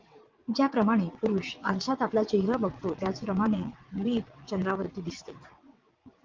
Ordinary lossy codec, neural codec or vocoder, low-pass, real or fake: Opus, 32 kbps; vocoder, 22.05 kHz, 80 mel bands, WaveNeXt; 7.2 kHz; fake